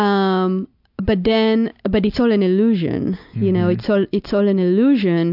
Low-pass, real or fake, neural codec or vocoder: 5.4 kHz; real; none